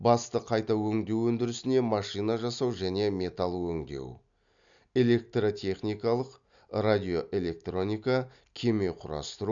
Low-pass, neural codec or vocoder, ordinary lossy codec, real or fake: 7.2 kHz; none; none; real